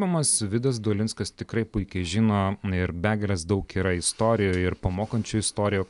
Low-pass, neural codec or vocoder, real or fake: 10.8 kHz; none; real